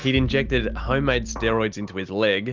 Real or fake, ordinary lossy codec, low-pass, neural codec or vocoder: real; Opus, 24 kbps; 7.2 kHz; none